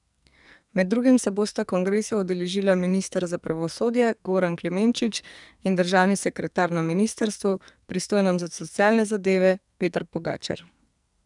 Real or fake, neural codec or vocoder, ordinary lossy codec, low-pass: fake; codec, 44.1 kHz, 2.6 kbps, SNAC; none; 10.8 kHz